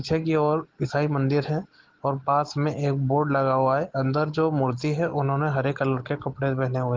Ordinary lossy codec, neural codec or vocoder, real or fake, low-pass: Opus, 16 kbps; none; real; 7.2 kHz